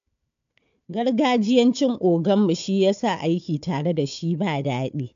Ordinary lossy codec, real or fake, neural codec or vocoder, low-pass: AAC, 64 kbps; fake; codec, 16 kHz, 4 kbps, FunCodec, trained on Chinese and English, 50 frames a second; 7.2 kHz